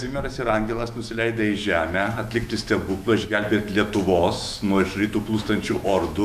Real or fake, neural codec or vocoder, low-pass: fake; autoencoder, 48 kHz, 128 numbers a frame, DAC-VAE, trained on Japanese speech; 14.4 kHz